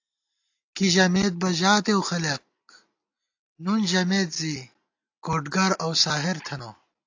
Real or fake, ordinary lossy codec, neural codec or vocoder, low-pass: real; AAC, 48 kbps; none; 7.2 kHz